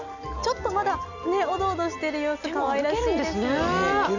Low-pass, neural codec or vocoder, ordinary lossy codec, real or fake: 7.2 kHz; none; none; real